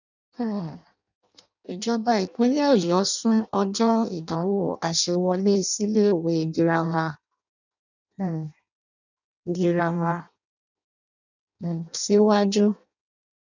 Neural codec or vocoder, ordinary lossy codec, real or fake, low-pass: codec, 16 kHz in and 24 kHz out, 0.6 kbps, FireRedTTS-2 codec; none; fake; 7.2 kHz